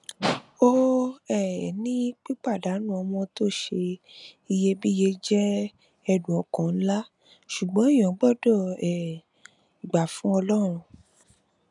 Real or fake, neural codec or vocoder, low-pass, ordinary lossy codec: real; none; 10.8 kHz; none